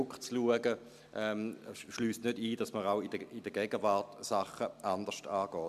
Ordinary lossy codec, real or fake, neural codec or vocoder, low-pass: MP3, 96 kbps; real; none; 14.4 kHz